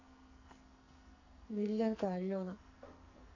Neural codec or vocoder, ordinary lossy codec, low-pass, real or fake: codec, 44.1 kHz, 2.6 kbps, SNAC; none; 7.2 kHz; fake